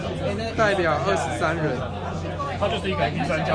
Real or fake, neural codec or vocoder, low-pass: real; none; 9.9 kHz